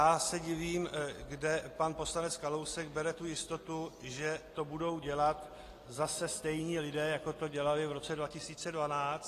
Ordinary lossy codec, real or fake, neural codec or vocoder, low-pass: AAC, 48 kbps; real; none; 14.4 kHz